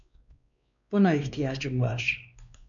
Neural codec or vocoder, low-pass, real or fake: codec, 16 kHz, 2 kbps, X-Codec, WavLM features, trained on Multilingual LibriSpeech; 7.2 kHz; fake